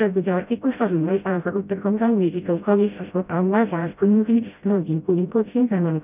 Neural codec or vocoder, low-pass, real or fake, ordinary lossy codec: codec, 16 kHz, 0.5 kbps, FreqCodec, smaller model; 3.6 kHz; fake; none